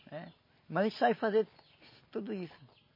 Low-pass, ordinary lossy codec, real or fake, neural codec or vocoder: 5.4 kHz; MP3, 24 kbps; real; none